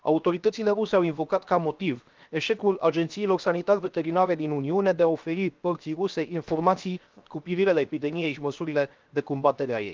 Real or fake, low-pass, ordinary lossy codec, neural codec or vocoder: fake; 7.2 kHz; Opus, 24 kbps; codec, 16 kHz, 0.7 kbps, FocalCodec